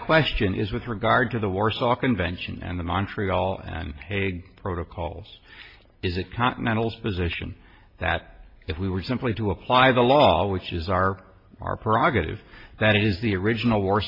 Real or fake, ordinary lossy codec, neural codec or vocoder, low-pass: real; MP3, 32 kbps; none; 5.4 kHz